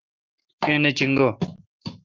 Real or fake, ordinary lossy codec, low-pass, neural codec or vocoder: fake; Opus, 32 kbps; 7.2 kHz; codec, 44.1 kHz, 7.8 kbps, Pupu-Codec